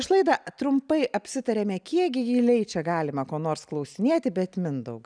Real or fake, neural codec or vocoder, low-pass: real; none; 9.9 kHz